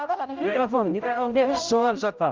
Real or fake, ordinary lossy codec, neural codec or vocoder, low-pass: fake; Opus, 32 kbps; codec, 16 kHz, 0.5 kbps, X-Codec, HuBERT features, trained on general audio; 7.2 kHz